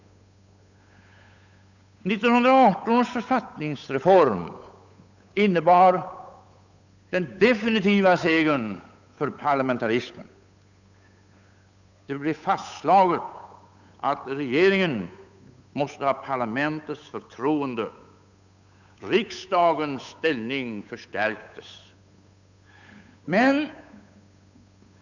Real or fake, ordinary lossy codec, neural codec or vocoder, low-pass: fake; none; codec, 16 kHz, 8 kbps, FunCodec, trained on Chinese and English, 25 frames a second; 7.2 kHz